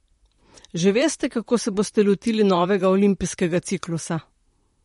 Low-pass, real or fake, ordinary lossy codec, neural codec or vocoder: 19.8 kHz; fake; MP3, 48 kbps; vocoder, 44.1 kHz, 128 mel bands, Pupu-Vocoder